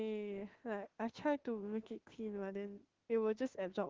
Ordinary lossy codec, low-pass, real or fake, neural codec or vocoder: Opus, 16 kbps; 7.2 kHz; fake; autoencoder, 48 kHz, 32 numbers a frame, DAC-VAE, trained on Japanese speech